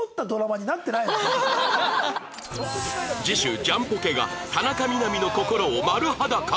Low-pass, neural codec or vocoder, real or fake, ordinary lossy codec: none; none; real; none